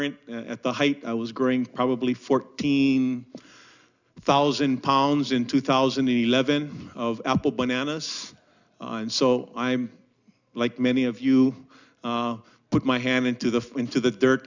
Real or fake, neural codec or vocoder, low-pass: real; none; 7.2 kHz